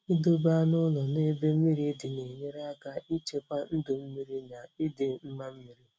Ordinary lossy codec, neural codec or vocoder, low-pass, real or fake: none; none; none; real